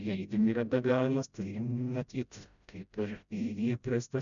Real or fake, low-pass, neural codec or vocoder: fake; 7.2 kHz; codec, 16 kHz, 0.5 kbps, FreqCodec, smaller model